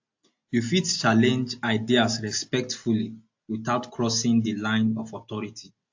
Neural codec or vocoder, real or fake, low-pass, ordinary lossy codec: none; real; 7.2 kHz; AAC, 48 kbps